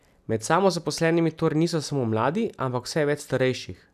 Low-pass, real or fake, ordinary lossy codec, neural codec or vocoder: 14.4 kHz; real; none; none